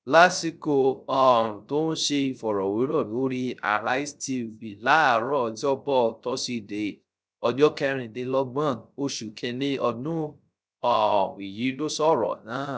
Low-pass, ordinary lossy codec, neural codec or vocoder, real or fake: none; none; codec, 16 kHz, 0.3 kbps, FocalCodec; fake